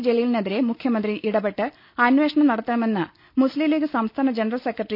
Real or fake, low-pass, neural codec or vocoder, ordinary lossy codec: real; 5.4 kHz; none; none